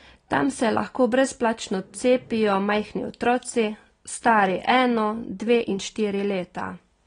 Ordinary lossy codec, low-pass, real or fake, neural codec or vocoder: AAC, 32 kbps; 9.9 kHz; real; none